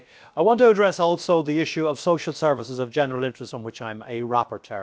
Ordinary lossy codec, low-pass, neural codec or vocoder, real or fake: none; none; codec, 16 kHz, about 1 kbps, DyCAST, with the encoder's durations; fake